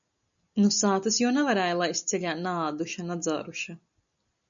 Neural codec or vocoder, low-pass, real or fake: none; 7.2 kHz; real